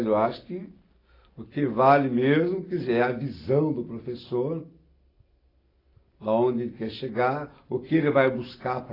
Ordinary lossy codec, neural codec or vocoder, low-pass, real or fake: AAC, 24 kbps; none; 5.4 kHz; real